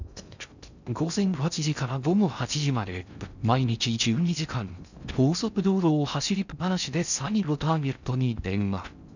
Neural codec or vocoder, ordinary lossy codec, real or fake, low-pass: codec, 16 kHz in and 24 kHz out, 0.6 kbps, FocalCodec, streaming, 2048 codes; none; fake; 7.2 kHz